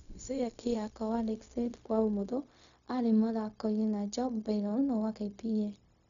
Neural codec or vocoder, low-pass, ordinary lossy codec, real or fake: codec, 16 kHz, 0.4 kbps, LongCat-Audio-Codec; 7.2 kHz; none; fake